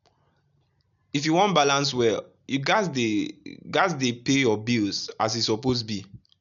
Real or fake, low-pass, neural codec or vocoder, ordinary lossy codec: real; 7.2 kHz; none; none